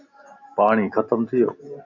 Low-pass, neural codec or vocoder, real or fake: 7.2 kHz; none; real